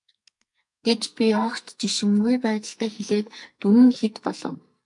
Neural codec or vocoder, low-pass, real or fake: codec, 44.1 kHz, 2.6 kbps, SNAC; 10.8 kHz; fake